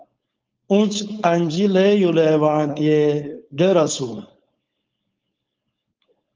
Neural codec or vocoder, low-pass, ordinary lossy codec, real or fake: codec, 16 kHz, 4.8 kbps, FACodec; 7.2 kHz; Opus, 32 kbps; fake